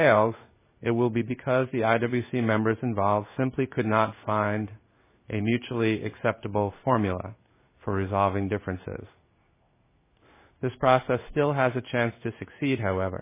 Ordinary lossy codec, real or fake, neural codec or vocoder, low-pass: MP3, 16 kbps; fake; codec, 16 kHz in and 24 kHz out, 1 kbps, XY-Tokenizer; 3.6 kHz